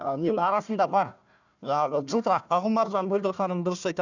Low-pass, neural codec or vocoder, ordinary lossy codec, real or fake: 7.2 kHz; codec, 16 kHz, 1 kbps, FunCodec, trained on Chinese and English, 50 frames a second; none; fake